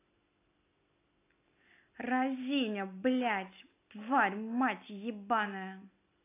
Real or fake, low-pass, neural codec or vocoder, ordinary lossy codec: real; 3.6 kHz; none; AAC, 24 kbps